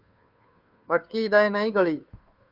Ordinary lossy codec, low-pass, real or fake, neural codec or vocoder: Opus, 64 kbps; 5.4 kHz; fake; codec, 16 kHz, 2 kbps, FunCodec, trained on Chinese and English, 25 frames a second